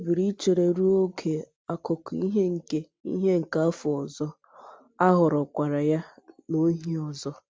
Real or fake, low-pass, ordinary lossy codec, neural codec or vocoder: real; none; none; none